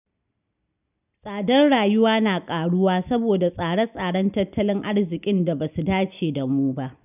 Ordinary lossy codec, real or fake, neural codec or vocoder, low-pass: none; real; none; 3.6 kHz